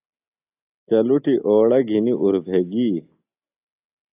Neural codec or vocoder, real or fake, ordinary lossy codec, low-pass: none; real; AAC, 32 kbps; 3.6 kHz